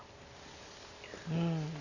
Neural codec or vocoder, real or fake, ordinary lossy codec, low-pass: none; real; none; 7.2 kHz